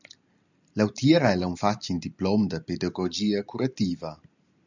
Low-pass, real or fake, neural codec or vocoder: 7.2 kHz; real; none